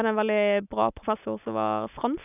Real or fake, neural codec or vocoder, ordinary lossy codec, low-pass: real; none; none; 3.6 kHz